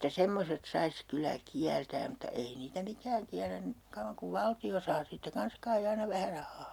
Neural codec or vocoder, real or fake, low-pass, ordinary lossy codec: none; real; 19.8 kHz; none